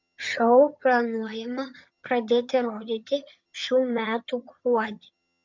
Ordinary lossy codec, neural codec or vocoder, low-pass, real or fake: MP3, 64 kbps; vocoder, 22.05 kHz, 80 mel bands, HiFi-GAN; 7.2 kHz; fake